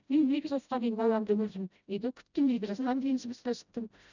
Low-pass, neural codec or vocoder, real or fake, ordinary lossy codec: 7.2 kHz; codec, 16 kHz, 0.5 kbps, FreqCodec, smaller model; fake; none